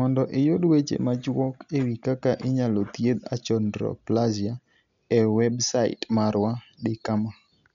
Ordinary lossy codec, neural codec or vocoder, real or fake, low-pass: none; none; real; 7.2 kHz